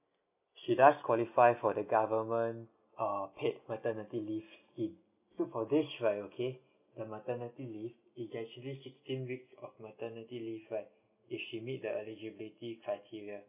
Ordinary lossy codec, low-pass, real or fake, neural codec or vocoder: none; 3.6 kHz; real; none